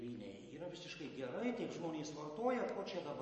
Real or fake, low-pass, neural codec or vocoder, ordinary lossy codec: real; 10.8 kHz; none; MP3, 32 kbps